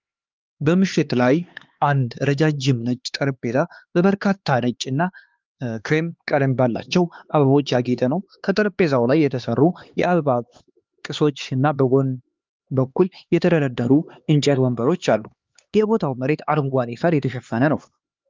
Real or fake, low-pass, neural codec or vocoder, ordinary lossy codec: fake; 7.2 kHz; codec, 16 kHz, 2 kbps, X-Codec, HuBERT features, trained on LibriSpeech; Opus, 32 kbps